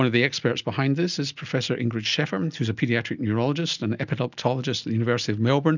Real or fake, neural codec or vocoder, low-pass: real; none; 7.2 kHz